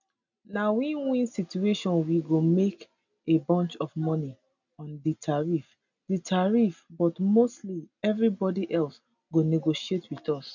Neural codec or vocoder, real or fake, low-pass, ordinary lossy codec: none; real; 7.2 kHz; none